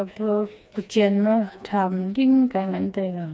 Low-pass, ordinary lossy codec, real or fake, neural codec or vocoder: none; none; fake; codec, 16 kHz, 2 kbps, FreqCodec, smaller model